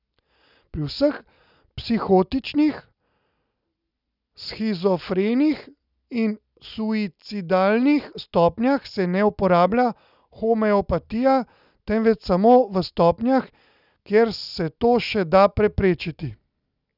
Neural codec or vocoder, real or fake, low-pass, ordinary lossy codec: none; real; 5.4 kHz; none